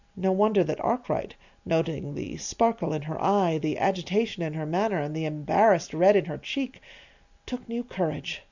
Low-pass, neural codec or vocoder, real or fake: 7.2 kHz; none; real